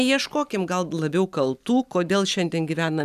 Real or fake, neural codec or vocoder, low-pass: real; none; 14.4 kHz